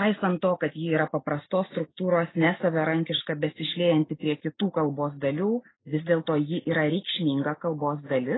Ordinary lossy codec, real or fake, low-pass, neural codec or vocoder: AAC, 16 kbps; real; 7.2 kHz; none